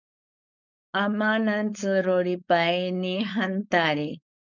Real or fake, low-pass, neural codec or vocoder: fake; 7.2 kHz; codec, 16 kHz, 4.8 kbps, FACodec